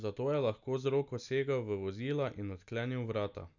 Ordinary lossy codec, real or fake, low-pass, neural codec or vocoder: none; real; 7.2 kHz; none